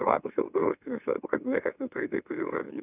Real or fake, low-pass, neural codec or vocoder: fake; 3.6 kHz; autoencoder, 44.1 kHz, a latent of 192 numbers a frame, MeloTTS